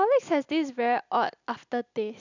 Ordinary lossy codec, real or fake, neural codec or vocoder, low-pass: none; real; none; 7.2 kHz